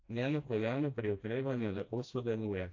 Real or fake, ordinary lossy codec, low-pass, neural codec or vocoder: fake; AAC, 48 kbps; 7.2 kHz; codec, 16 kHz, 1 kbps, FreqCodec, smaller model